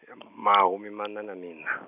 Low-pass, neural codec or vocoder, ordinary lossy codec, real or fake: 3.6 kHz; none; none; real